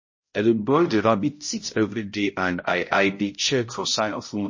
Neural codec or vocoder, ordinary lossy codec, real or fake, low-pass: codec, 16 kHz, 0.5 kbps, X-Codec, HuBERT features, trained on balanced general audio; MP3, 32 kbps; fake; 7.2 kHz